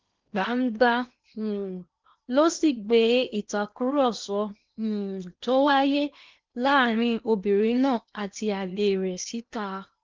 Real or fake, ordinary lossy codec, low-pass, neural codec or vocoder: fake; Opus, 24 kbps; 7.2 kHz; codec, 16 kHz in and 24 kHz out, 0.8 kbps, FocalCodec, streaming, 65536 codes